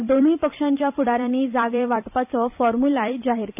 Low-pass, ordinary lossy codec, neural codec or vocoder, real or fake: 3.6 kHz; none; vocoder, 44.1 kHz, 128 mel bands every 256 samples, BigVGAN v2; fake